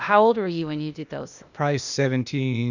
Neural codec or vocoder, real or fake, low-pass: codec, 16 kHz, 0.8 kbps, ZipCodec; fake; 7.2 kHz